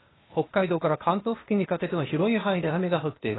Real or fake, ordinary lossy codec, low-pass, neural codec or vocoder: fake; AAC, 16 kbps; 7.2 kHz; codec, 16 kHz, 0.8 kbps, ZipCodec